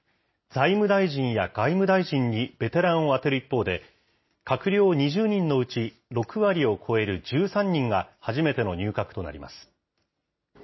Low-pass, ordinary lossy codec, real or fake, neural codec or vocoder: 7.2 kHz; MP3, 24 kbps; real; none